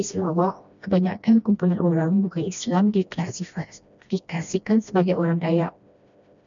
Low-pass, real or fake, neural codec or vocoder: 7.2 kHz; fake; codec, 16 kHz, 1 kbps, FreqCodec, smaller model